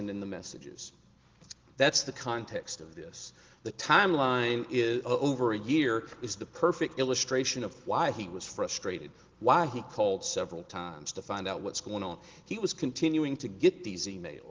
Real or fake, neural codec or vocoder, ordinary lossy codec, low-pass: real; none; Opus, 16 kbps; 7.2 kHz